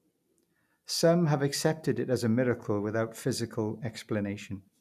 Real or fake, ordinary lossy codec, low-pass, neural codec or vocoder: real; none; 14.4 kHz; none